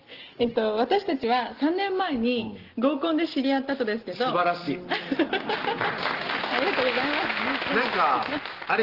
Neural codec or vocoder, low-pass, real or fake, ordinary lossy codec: none; 5.4 kHz; real; Opus, 16 kbps